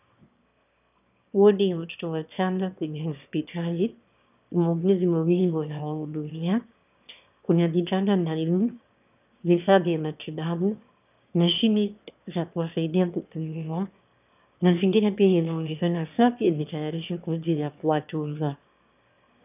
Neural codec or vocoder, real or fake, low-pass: autoencoder, 22.05 kHz, a latent of 192 numbers a frame, VITS, trained on one speaker; fake; 3.6 kHz